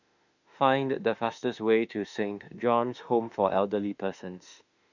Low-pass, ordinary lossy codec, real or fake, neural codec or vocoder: 7.2 kHz; none; fake; autoencoder, 48 kHz, 32 numbers a frame, DAC-VAE, trained on Japanese speech